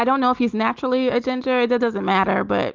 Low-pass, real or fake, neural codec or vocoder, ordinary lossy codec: 7.2 kHz; real; none; Opus, 24 kbps